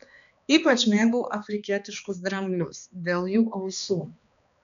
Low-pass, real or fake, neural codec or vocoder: 7.2 kHz; fake; codec, 16 kHz, 2 kbps, X-Codec, HuBERT features, trained on balanced general audio